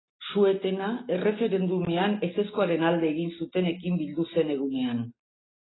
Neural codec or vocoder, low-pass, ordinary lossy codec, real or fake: none; 7.2 kHz; AAC, 16 kbps; real